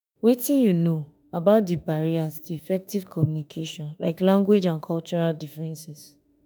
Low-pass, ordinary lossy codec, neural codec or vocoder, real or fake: none; none; autoencoder, 48 kHz, 32 numbers a frame, DAC-VAE, trained on Japanese speech; fake